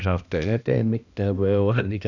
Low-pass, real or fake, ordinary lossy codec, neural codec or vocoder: 7.2 kHz; fake; none; codec, 16 kHz, 1 kbps, X-Codec, HuBERT features, trained on balanced general audio